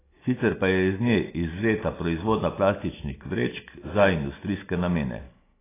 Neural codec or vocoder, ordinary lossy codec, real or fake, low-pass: none; AAC, 16 kbps; real; 3.6 kHz